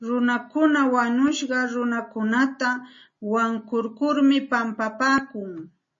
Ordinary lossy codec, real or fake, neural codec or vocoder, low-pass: MP3, 32 kbps; real; none; 7.2 kHz